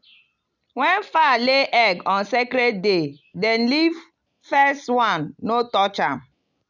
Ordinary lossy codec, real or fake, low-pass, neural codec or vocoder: none; real; 7.2 kHz; none